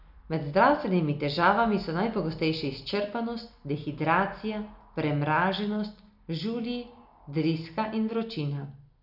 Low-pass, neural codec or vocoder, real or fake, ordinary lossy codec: 5.4 kHz; none; real; none